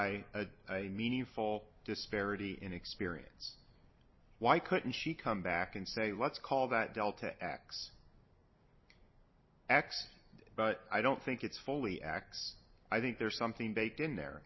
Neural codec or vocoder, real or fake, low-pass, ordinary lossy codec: none; real; 7.2 kHz; MP3, 24 kbps